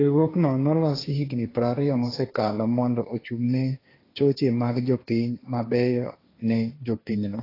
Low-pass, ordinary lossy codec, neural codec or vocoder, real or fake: 5.4 kHz; AAC, 24 kbps; codec, 16 kHz, 1.1 kbps, Voila-Tokenizer; fake